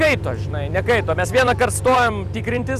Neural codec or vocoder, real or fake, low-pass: vocoder, 44.1 kHz, 128 mel bands every 256 samples, BigVGAN v2; fake; 14.4 kHz